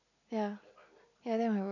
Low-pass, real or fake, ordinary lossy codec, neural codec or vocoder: 7.2 kHz; real; none; none